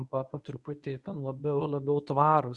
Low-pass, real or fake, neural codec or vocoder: 10.8 kHz; fake; codec, 24 kHz, 0.9 kbps, WavTokenizer, medium speech release version 1